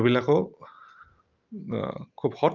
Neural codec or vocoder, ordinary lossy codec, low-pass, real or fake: none; Opus, 32 kbps; 7.2 kHz; real